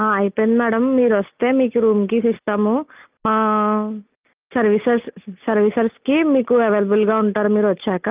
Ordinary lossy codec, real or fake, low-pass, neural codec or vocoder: Opus, 24 kbps; real; 3.6 kHz; none